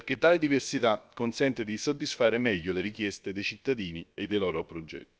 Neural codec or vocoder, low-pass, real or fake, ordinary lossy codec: codec, 16 kHz, about 1 kbps, DyCAST, with the encoder's durations; none; fake; none